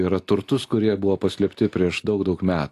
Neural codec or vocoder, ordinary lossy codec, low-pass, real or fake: none; AAC, 64 kbps; 14.4 kHz; real